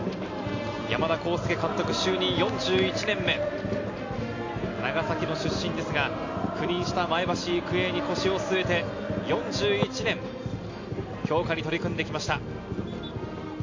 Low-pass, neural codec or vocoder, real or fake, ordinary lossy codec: 7.2 kHz; none; real; AAC, 48 kbps